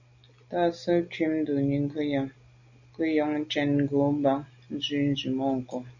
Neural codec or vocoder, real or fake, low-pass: none; real; 7.2 kHz